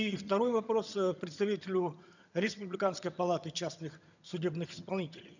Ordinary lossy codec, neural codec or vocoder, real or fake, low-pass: none; vocoder, 22.05 kHz, 80 mel bands, HiFi-GAN; fake; 7.2 kHz